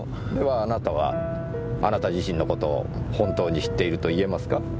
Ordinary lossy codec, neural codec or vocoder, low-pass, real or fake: none; none; none; real